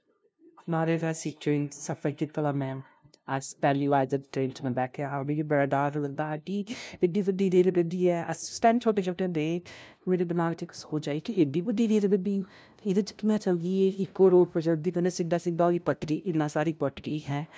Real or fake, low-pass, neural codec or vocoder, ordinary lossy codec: fake; none; codec, 16 kHz, 0.5 kbps, FunCodec, trained on LibriTTS, 25 frames a second; none